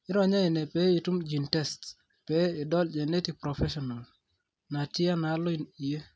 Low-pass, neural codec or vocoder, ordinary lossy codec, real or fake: none; none; none; real